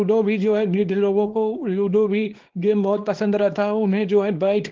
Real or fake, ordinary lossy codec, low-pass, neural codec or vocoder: fake; Opus, 16 kbps; 7.2 kHz; codec, 24 kHz, 0.9 kbps, WavTokenizer, small release